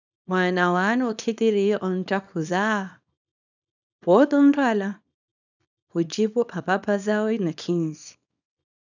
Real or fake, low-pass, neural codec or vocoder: fake; 7.2 kHz; codec, 24 kHz, 0.9 kbps, WavTokenizer, small release